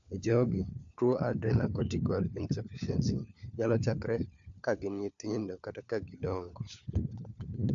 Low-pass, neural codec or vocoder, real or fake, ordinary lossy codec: 7.2 kHz; codec, 16 kHz, 4 kbps, FunCodec, trained on LibriTTS, 50 frames a second; fake; AAC, 64 kbps